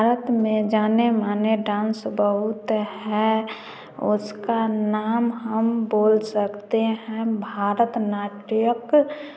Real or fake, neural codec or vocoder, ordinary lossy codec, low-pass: real; none; none; none